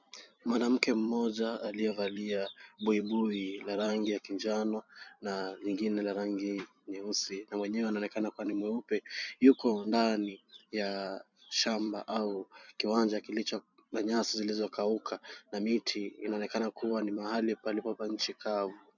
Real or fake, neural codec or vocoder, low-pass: fake; vocoder, 44.1 kHz, 128 mel bands every 256 samples, BigVGAN v2; 7.2 kHz